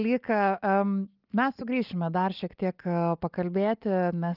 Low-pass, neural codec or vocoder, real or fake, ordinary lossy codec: 5.4 kHz; none; real; Opus, 24 kbps